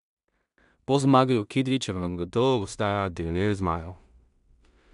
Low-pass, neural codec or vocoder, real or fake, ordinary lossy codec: 10.8 kHz; codec, 16 kHz in and 24 kHz out, 0.4 kbps, LongCat-Audio-Codec, two codebook decoder; fake; none